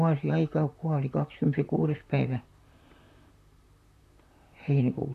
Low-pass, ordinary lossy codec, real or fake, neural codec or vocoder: 14.4 kHz; none; fake; vocoder, 44.1 kHz, 128 mel bands, Pupu-Vocoder